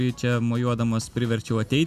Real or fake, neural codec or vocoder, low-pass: real; none; 14.4 kHz